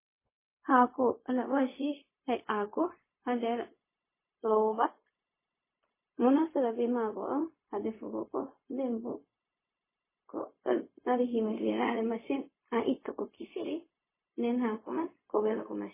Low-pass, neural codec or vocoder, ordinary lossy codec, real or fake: 3.6 kHz; codec, 16 kHz, 0.4 kbps, LongCat-Audio-Codec; MP3, 16 kbps; fake